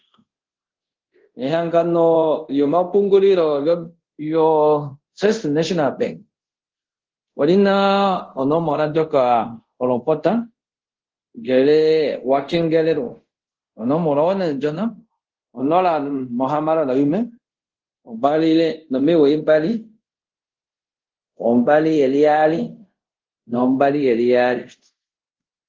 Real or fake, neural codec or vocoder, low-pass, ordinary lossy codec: fake; codec, 24 kHz, 0.5 kbps, DualCodec; 7.2 kHz; Opus, 16 kbps